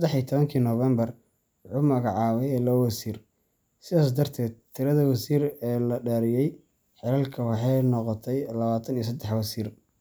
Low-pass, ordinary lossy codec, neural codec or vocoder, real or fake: none; none; none; real